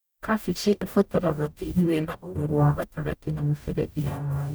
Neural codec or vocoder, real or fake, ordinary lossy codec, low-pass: codec, 44.1 kHz, 0.9 kbps, DAC; fake; none; none